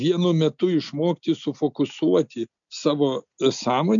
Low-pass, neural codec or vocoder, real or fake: 7.2 kHz; none; real